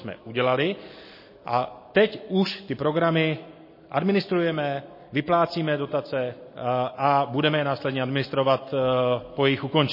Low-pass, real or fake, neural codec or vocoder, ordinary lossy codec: 5.4 kHz; real; none; MP3, 24 kbps